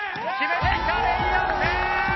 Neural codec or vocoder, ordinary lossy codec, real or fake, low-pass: none; MP3, 24 kbps; real; 7.2 kHz